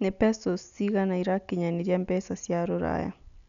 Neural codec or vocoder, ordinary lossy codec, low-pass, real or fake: none; none; 7.2 kHz; real